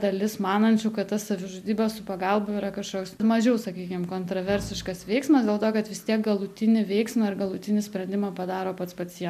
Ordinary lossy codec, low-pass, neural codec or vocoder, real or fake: MP3, 96 kbps; 14.4 kHz; none; real